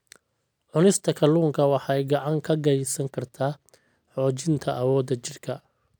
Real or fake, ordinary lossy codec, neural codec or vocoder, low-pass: real; none; none; none